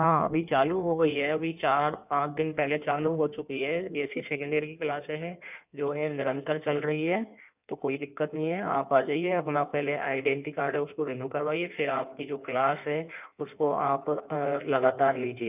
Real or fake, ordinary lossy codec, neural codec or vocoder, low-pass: fake; none; codec, 16 kHz in and 24 kHz out, 1.1 kbps, FireRedTTS-2 codec; 3.6 kHz